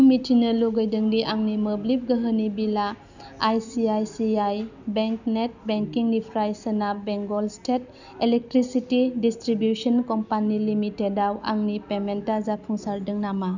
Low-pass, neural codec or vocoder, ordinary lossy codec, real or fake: 7.2 kHz; none; none; real